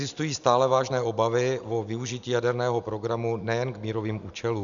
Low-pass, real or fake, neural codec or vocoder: 7.2 kHz; real; none